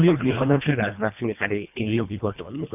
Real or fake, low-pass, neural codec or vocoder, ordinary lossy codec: fake; 3.6 kHz; codec, 24 kHz, 1.5 kbps, HILCodec; none